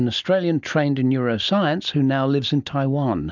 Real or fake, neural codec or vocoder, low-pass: real; none; 7.2 kHz